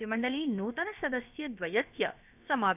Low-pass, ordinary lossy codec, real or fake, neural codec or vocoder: 3.6 kHz; none; fake; codec, 16 kHz, about 1 kbps, DyCAST, with the encoder's durations